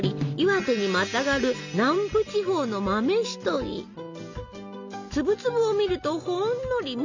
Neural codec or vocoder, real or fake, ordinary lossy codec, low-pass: none; real; MP3, 64 kbps; 7.2 kHz